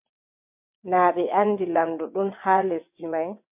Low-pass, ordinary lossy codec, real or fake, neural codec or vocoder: 3.6 kHz; MP3, 32 kbps; fake; vocoder, 22.05 kHz, 80 mel bands, WaveNeXt